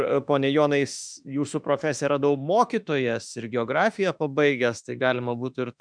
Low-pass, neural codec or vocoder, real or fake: 9.9 kHz; autoencoder, 48 kHz, 32 numbers a frame, DAC-VAE, trained on Japanese speech; fake